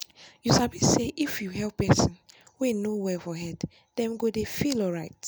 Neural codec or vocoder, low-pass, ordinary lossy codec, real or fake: none; none; none; real